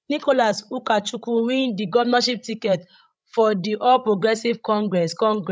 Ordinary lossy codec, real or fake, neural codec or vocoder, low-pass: none; fake; codec, 16 kHz, 16 kbps, FreqCodec, larger model; none